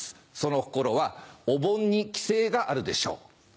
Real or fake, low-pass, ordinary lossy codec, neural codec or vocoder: real; none; none; none